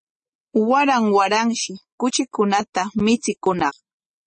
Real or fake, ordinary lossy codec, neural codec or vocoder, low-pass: real; MP3, 32 kbps; none; 10.8 kHz